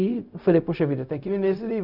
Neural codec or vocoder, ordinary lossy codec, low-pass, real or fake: codec, 16 kHz, 0.4 kbps, LongCat-Audio-Codec; none; 5.4 kHz; fake